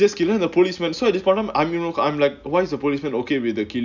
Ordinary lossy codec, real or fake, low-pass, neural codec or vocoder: none; real; 7.2 kHz; none